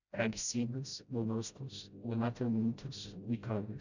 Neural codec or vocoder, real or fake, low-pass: codec, 16 kHz, 0.5 kbps, FreqCodec, smaller model; fake; 7.2 kHz